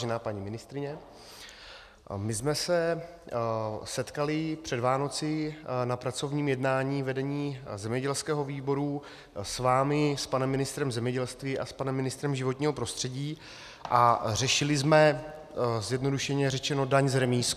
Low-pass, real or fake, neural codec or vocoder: 14.4 kHz; real; none